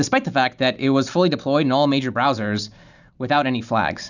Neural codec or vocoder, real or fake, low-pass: none; real; 7.2 kHz